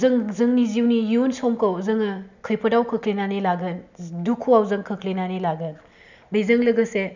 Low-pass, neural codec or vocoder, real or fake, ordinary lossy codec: 7.2 kHz; none; real; none